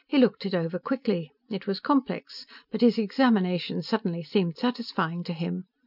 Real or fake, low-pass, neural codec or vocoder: real; 5.4 kHz; none